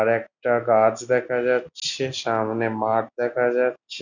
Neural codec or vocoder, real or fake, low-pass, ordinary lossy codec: none; real; 7.2 kHz; none